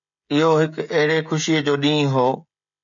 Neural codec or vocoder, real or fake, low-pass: codec, 16 kHz, 16 kbps, FreqCodec, smaller model; fake; 7.2 kHz